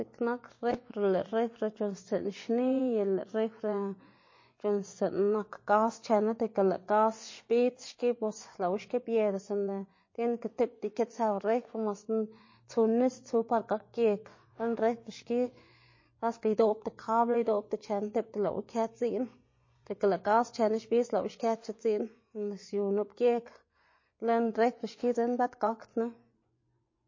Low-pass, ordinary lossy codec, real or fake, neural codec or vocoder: 7.2 kHz; MP3, 32 kbps; fake; vocoder, 24 kHz, 100 mel bands, Vocos